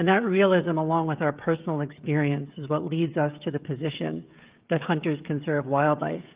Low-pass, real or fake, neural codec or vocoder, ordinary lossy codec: 3.6 kHz; fake; codec, 16 kHz, 16 kbps, FreqCodec, smaller model; Opus, 32 kbps